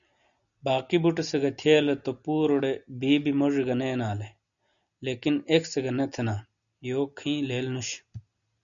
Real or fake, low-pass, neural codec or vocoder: real; 7.2 kHz; none